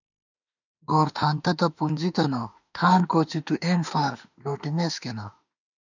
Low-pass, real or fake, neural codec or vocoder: 7.2 kHz; fake; autoencoder, 48 kHz, 32 numbers a frame, DAC-VAE, trained on Japanese speech